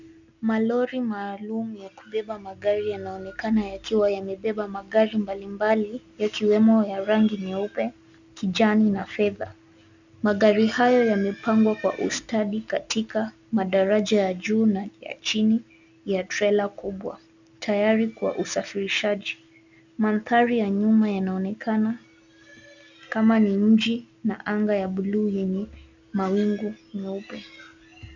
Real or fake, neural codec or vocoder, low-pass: real; none; 7.2 kHz